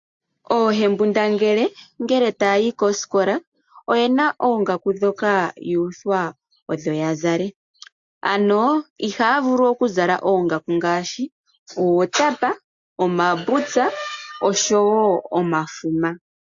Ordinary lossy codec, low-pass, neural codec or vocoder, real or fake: AAC, 64 kbps; 7.2 kHz; none; real